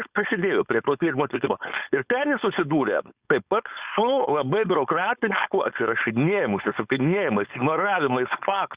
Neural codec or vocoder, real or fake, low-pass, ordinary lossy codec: codec, 16 kHz, 4.8 kbps, FACodec; fake; 3.6 kHz; Opus, 64 kbps